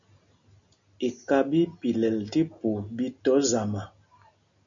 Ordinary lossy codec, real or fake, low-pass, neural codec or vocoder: MP3, 64 kbps; real; 7.2 kHz; none